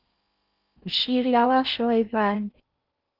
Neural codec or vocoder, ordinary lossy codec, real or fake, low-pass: codec, 16 kHz in and 24 kHz out, 0.6 kbps, FocalCodec, streaming, 4096 codes; Opus, 24 kbps; fake; 5.4 kHz